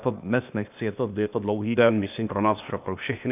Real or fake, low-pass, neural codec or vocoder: fake; 3.6 kHz; codec, 16 kHz, 0.8 kbps, ZipCodec